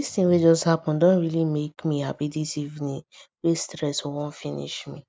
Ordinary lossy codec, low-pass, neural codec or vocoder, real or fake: none; none; none; real